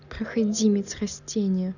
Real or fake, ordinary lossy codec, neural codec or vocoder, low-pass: real; none; none; 7.2 kHz